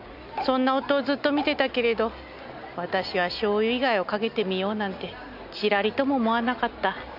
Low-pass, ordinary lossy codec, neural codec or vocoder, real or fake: 5.4 kHz; none; none; real